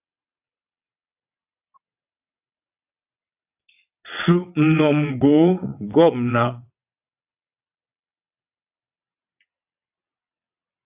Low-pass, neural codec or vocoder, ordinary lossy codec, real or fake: 3.6 kHz; vocoder, 22.05 kHz, 80 mel bands, WaveNeXt; AAC, 32 kbps; fake